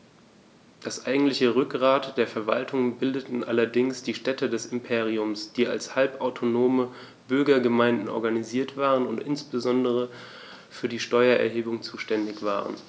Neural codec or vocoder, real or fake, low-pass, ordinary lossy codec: none; real; none; none